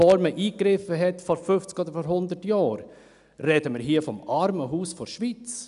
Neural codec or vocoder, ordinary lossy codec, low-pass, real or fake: none; none; 10.8 kHz; real